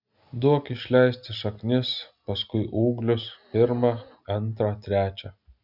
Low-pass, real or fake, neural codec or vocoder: 5.4 kHz; real; none